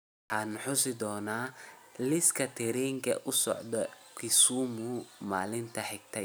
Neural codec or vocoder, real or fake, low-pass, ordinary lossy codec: none; real; none; none